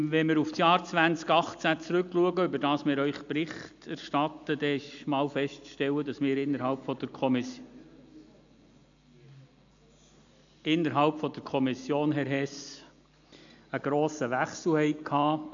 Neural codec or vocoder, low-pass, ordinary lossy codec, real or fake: none; 7.2 kHz; AAC, 64 kbps; real